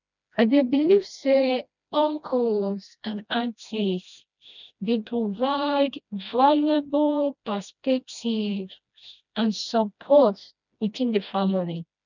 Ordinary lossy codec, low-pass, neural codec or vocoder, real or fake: none; 7.2 kHz; codec, 16 kHz, 1 kbps, FreqCodec, smaller model; fake